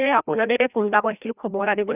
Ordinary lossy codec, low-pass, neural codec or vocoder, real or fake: none; 3.6 kHz; codec, 16 kHz, 1 kbps, FreqCodec, larger model; fake